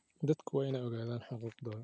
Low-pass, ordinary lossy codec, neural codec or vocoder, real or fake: none; none; none; real